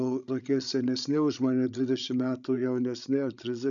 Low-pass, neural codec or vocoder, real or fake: 7.2 kHz; codec, 16 kHz, 16 kbps, FreqCodec, larger model; fake